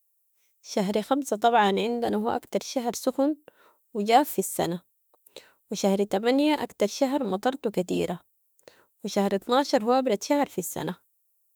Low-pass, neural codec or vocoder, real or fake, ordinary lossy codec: none; autoencoder, 48 kHz, 32 numbers a frame, DAC-VAE, trained on Japanese speech; fake; none